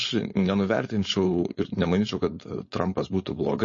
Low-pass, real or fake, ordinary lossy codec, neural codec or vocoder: 7.2 kHz; fake; MP3, 32 kbps; codec, 16 kHz, 4.8 kbps, FACodec